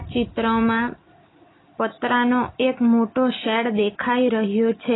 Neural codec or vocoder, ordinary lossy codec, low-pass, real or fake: none; AAC, 16 kbps; 7.2 kHz; real